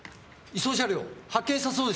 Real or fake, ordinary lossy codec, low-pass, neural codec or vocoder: real; none; none; none